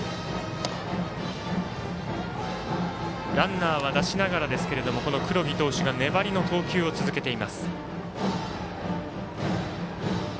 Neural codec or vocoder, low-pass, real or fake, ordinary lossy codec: none; none; real; none